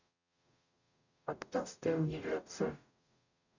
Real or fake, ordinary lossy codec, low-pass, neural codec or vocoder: fake; none; 7.2 kHz; codec, 44.1 kHz, 0.9 kbps, DAC